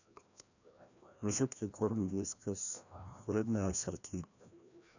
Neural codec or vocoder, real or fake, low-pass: codec, 16 kHz, 1 kbps, FreqCodec, larger model; fake; 7.2 kHz